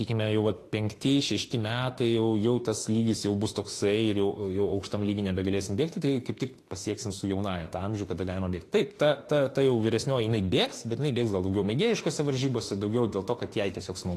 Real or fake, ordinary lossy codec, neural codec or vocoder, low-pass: fake; AAC, 48 kbps; autoencoder, 48 kHz, 32 numbers a frame, DAC-VAE, trained on Japanese speech; 14.4 kHz